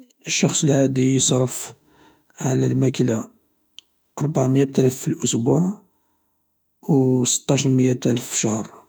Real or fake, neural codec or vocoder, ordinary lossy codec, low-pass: fake; autoencoder, 48 kHz, 32 numbers a frame, DAC-VAE, trained on Japanese speech; none; none